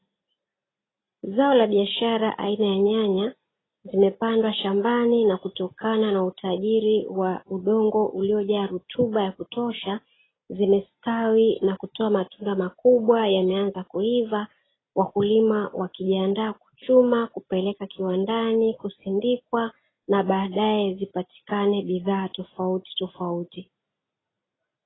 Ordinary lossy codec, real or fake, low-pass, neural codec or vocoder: AAC, 16 kbps; real; 7.2 kHz; none